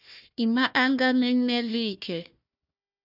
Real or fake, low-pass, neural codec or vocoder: fake; 5.4 kHz; codec, 16 kHz, 1 kbps, FunCodec, trained on Chinese and English, 50 frames a second